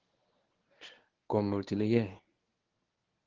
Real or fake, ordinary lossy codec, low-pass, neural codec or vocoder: fake; Opus, 16 kbps; 7.2 kHz; codec, 24 kHz, 0.9 kbps, WavTokenizer, medium speech release version 1